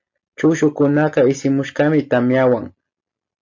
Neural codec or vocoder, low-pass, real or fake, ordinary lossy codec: none; 7.2 kHz; real; MP3, 48 kbps